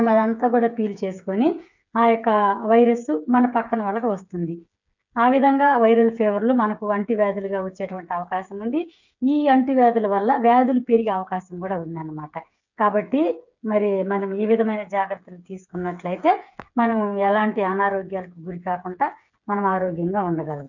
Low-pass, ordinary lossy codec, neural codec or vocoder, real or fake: 7.2 kHz; none; codec, 16 kHz, 8 kbps, FreqCodec, smaller model; fake